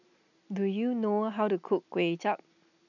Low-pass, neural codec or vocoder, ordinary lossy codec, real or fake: 7.2 kHz; none; none; real